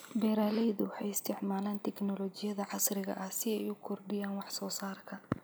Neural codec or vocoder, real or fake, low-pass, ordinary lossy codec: none; real; none; none